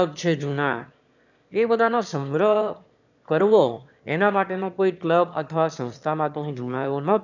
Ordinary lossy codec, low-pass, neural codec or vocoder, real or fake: none; 7.2 kHz; autoencoder, 22.05 kHz, a latent of 192 numbers a frame, VITS, trained on one speaker; fake